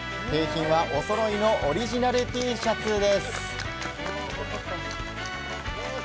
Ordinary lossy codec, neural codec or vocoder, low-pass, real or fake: none; none; none; real